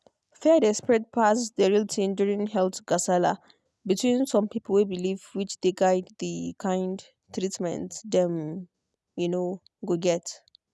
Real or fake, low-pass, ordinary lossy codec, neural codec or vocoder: real; none; none; none